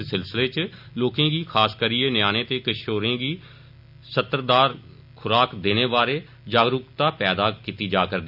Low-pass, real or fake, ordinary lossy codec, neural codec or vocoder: 5.4 kHz; real; none; none